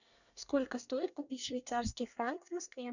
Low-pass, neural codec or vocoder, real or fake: 7.2 kHz; codec, 24 kHz, 1 kbps, SNAC; fake